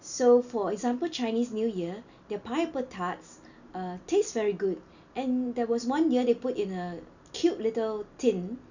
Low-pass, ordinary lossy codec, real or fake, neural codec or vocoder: 7.2 kHz; none; real; none